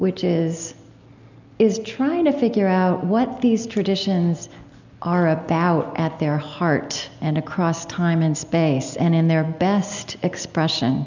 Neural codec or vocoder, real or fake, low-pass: none; real; 7.2 kHz